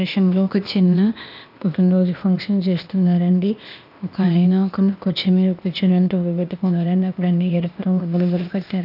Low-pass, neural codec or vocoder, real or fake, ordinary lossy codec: 5.4 kHz; codec, 16 kHz, 0.8 kbps, ZipCodec; fake; none